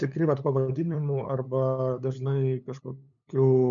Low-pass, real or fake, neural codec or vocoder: 7.2 kHz; fake; codec, 16 kHz, 8 kbps, FunCodec, trained on LibriTTS, 25 frames a second